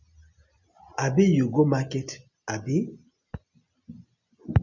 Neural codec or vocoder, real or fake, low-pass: none; real; 7.2 kHz